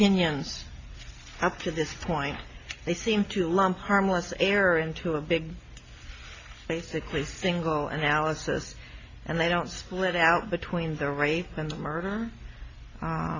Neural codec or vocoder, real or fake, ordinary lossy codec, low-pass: none; real; AAC, 48 kbps; 7.2 kHz